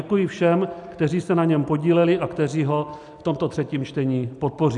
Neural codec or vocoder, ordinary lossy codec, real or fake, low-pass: none; MP3, 96 kbps; real; 10.8 kHz